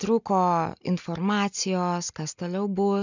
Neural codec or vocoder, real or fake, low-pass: none; real; 7.2 kHz